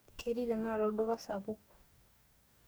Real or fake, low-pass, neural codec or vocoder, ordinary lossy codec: fake; none; codec, 44.1 kHz, 2.6 kbps, DAC; none